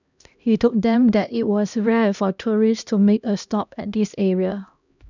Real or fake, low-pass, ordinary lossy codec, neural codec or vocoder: fake; 7.2 kHz; none; codec, 16 kHz, 1 kbps, X-Codec, HuBERT features, trained on LibriSpeech